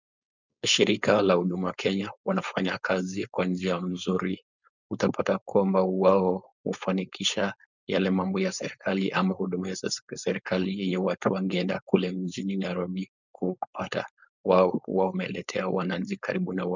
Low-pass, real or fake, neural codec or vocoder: 7.2 kHz; fake; codec, 16 kHz, 4.8 kbps, FACodec